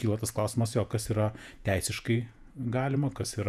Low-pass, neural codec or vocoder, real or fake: 14.4 kHz; vocoder, 48 kHz, 128 mel bands, Vocos; fake